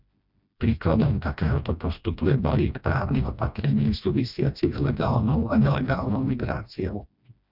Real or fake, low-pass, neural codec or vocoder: fake; 5.4 kHz; codec, 16 kHz, 1 kbps, FreqCodec, smaller model